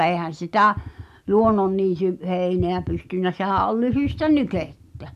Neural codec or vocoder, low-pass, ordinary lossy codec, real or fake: none; 14.4 kHz; MP3, 96 kbps; real